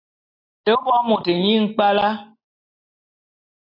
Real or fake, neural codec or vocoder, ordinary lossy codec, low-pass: real; none; AAC, 32 kbps; 5.4 kHz